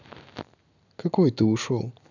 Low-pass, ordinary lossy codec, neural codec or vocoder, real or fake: 7.2 kHz; none; none; real